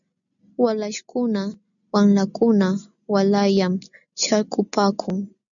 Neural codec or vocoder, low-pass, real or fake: none; 7.2 kHz; real